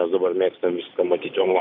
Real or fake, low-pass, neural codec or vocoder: real; 5.4 kHz; none